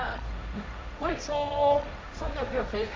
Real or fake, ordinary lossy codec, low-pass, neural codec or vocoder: fake; none; none; codec, 16 kHz, 1.1 kbps, Voila-Tokenizer